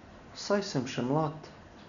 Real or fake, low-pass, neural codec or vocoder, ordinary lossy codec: real; 7.2 kHz; none; none